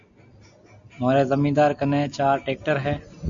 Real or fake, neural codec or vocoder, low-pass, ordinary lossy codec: real; none; 7.2 kHz; AAC, 64 kbps